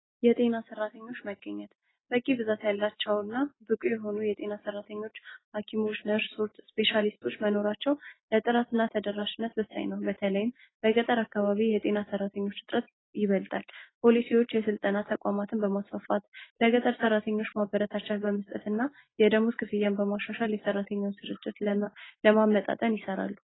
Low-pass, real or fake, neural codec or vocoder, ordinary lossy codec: 7.2 kHz; real; none; AAC, 16 kbps